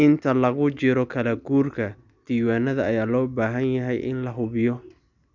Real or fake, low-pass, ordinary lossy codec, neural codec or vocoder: real; 7.2 kHz; none; none